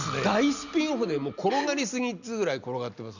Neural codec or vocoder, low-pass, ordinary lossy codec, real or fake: none; 7.2 kHz; none; real